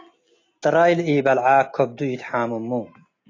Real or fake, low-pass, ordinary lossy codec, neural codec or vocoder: real; 7.2 kHz; AAC, 32 kbps; none